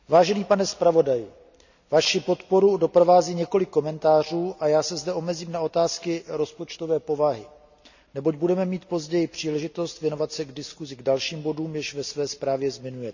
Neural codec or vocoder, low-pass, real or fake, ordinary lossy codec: none; 7.2 kHz; real; none